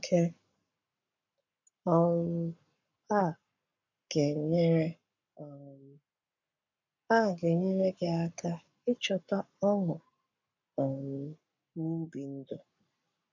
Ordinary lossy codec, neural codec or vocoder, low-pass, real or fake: none; codec, 44.1 kHz, 7.8 kbps, Pupu-Codec; 7.2 kHz; fake